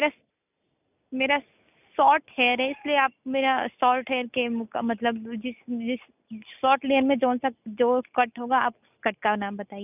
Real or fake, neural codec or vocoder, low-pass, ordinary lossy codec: real; none; 3.6 kHz; none